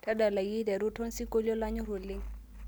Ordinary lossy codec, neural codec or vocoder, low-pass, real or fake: none; none; none; real